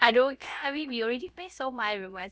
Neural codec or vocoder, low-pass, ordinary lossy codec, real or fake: codec, 16 kHz, about 1 kbps, DyCAST, with the encoder's durations; none; none; fake